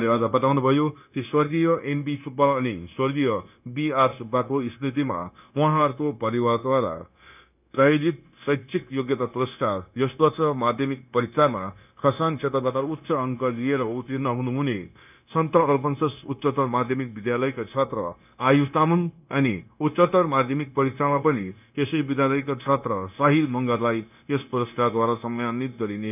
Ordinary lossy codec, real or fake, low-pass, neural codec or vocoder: none; fake; 3.6 kHz; codec, 16 kHz, 0.9 kbps, LongCat-Audio-Codec